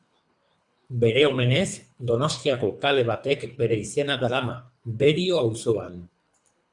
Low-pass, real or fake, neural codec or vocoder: 10.8 kHz; fake; codec, 24 kHz, 3 kbps, HILCodec